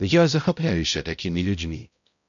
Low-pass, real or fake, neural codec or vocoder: 7.2 kHz; fake; codec, 16 kHz, 0.5 kbps, X-Codec, HuBERT features, trained on balanced general audio